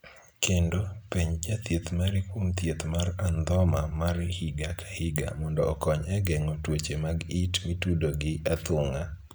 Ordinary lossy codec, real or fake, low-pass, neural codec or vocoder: none; real; none; none